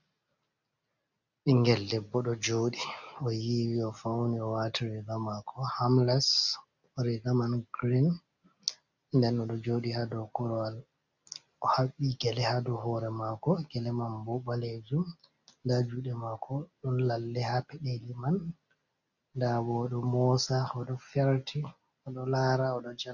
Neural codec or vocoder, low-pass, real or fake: none; 7.2 kHz; real